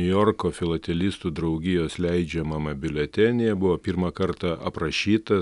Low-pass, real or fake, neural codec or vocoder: 10.8 kHz; real; none